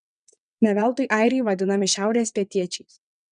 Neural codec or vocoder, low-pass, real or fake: vocoder, 22.05 kHz, 80 mel bands, WaveNeXt; 9.9 kHz; fake